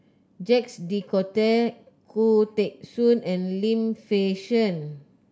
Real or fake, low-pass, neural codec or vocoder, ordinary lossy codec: real; none; none; none